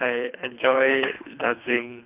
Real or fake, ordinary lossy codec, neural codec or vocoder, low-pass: fake; none; codec, 24 kHz, 3 kbps, HILCodec; 3.6 kHz